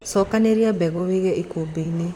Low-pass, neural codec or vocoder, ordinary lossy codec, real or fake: 19.8 kHz; vocoder, 44.1 kHz, 128 mel bands, Pupu-Vocoder; none; fake